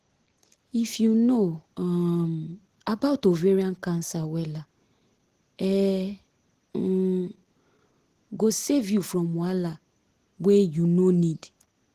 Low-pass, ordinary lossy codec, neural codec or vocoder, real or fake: 14.4 kHz; Opus, 16 kbps; none; real